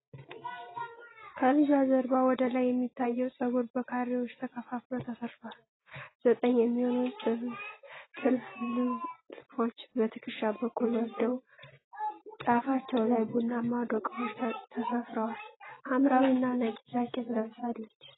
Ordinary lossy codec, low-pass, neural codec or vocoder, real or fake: AAC, 16 kbps; 7.2 kHz; none; real